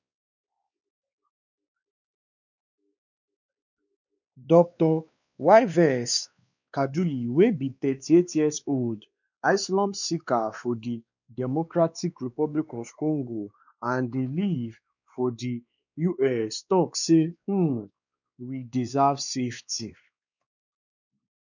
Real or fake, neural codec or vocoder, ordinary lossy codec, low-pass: fake; codec, 16 kHz, 2 kbps, X-Codec, WavLM features, trained on Multilingual LibriSpeech; none; 7.2 kHz